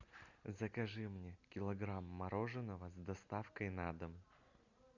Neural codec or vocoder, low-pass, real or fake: none; 7.2 kHz; real